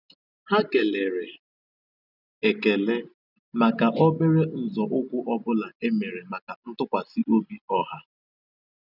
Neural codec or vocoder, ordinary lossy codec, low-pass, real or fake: none; none; 5.4 kHz; real